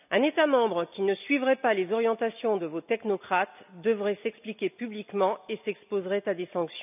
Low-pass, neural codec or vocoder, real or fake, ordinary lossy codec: 3.6 kHz; none; real; none